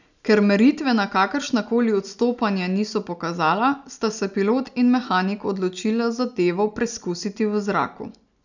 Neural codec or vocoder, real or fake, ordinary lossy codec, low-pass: none; real; none; 7.2 kHz